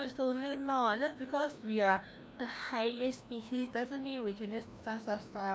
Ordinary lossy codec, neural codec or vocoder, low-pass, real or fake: none; codec, 16 kHz, 1 kbps, FreqCodec, larger model; none; fake